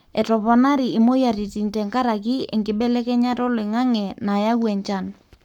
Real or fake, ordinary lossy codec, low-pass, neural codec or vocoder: fake; none; 19.8 kHz; codec, 44.1 kHz, 7.8 kbps, Pupu-Codec